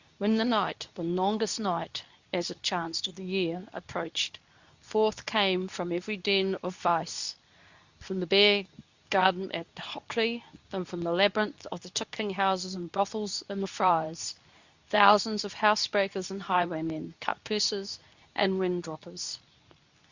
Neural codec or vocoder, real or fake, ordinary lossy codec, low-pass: codec, 24 kHz, 0.9 kbps, WavTokenizer, medium speech release version 2; fake; Opus, 64 kbps; 7.2 kHz